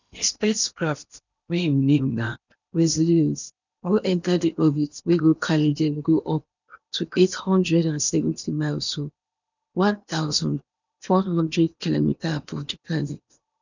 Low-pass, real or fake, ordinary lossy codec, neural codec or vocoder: 7.2 kHz; fake; none; codec, 16 kHz in and 24 kHz out, 0.8 kbps, FocalCodec, streaming, 65536 codes